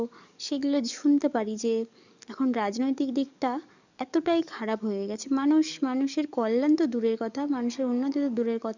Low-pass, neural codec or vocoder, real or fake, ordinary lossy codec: 7.2 kHz; codec, 44.1 kHz, 7.8 kbps, DAC; fake; none